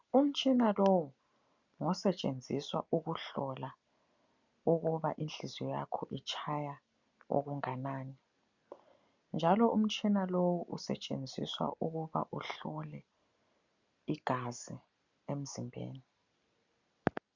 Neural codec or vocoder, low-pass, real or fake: none; 7.2 kHz; real